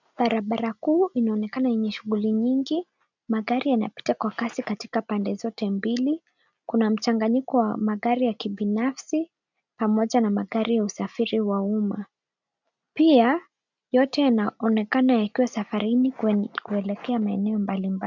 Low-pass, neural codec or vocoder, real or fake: 7.2 kHz; none; real